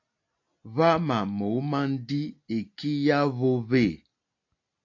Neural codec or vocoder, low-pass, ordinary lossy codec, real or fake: none; 7.2 kHz; AAC, 48 kbps; real